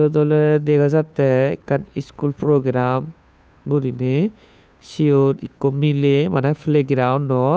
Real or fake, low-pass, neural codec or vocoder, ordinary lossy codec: fake; none; codec, 16 kHz, 2 kbps, FunCodec, trained on Chinese and English, 25 frames a second; none